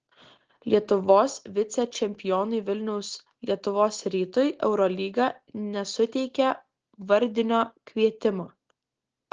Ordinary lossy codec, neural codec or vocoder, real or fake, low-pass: Opus, 16 kbps; none; real; 7.2 kHz